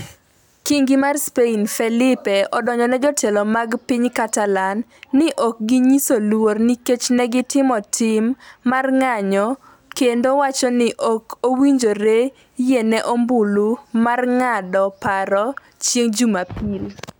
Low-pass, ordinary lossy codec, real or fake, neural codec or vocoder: none; none; real; none